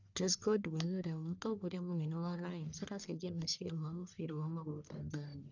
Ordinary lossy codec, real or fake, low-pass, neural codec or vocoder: none; fake; 7.2 kHz; codec, 44.1 kHz, 1.7 kbps, Pupu-Codec